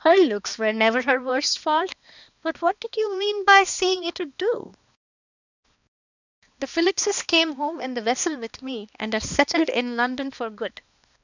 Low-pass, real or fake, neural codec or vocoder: 7.2 kHz; fake; codec, 16 kHz, 2 kbps, X-Codec, HuBERT features, trained on balanced general audio